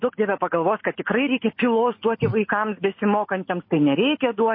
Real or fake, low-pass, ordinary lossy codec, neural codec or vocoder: real; 5.4 kHz; MP3, 24 kbps; none